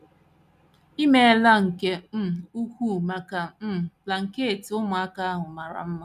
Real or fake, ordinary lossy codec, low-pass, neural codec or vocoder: real; none; 14.4 kHz; none